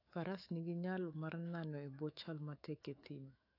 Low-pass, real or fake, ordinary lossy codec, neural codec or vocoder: 5.4 kHz; fake; AAC, 32 kbps; autoencoder, 48 kHz, 128 numbers a frame, DAC-VAE, trained on Japanese speech